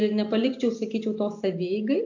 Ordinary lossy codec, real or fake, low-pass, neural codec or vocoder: AAC, 48 kbps; real; 7.2 kHz; none